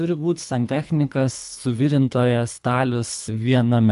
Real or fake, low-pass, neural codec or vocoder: fake; 10.8 kHz; codec, 24 kHz, 3 kbps, HILCodec